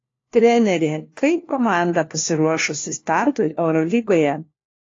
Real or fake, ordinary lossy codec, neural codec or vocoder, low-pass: fake; AAC, 32 kbps; codec, 16 kHz, 1 kbps, FunCodec, trained on LibriTTS, 50 frames a second; 7.2 kHz